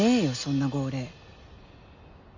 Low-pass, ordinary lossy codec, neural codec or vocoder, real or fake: 7.2 kHz; MP3, 64 kbps; none; real